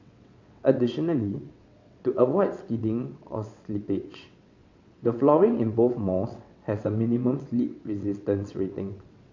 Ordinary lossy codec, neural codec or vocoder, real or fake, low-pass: MP3, 48 kbps; vocoder, 22.05 kHz, 80 mel bands, WaveNeXt; fake; 7.2 kHz